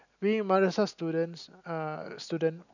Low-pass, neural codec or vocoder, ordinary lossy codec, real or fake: 7.2 kHz; none; none; real